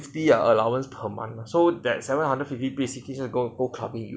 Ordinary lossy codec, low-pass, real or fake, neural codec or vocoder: none; none; real; none